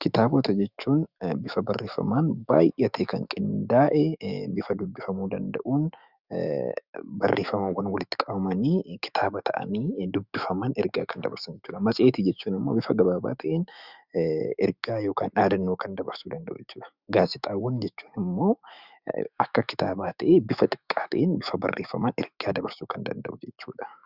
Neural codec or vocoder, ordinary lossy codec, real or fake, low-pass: autoencoder, 48 kHz, 128 numbers a frame, DAC-VAE, trained on Japanese speech; Opus, 64 kbps; fake; 5.4 kHz